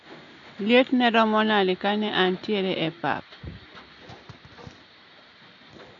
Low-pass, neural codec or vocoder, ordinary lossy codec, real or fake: 7.2 kHz; none; none; real